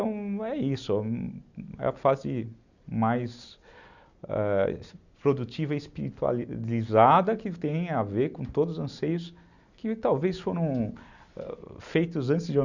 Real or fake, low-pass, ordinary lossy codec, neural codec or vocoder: real; 7.2 kHz; none; none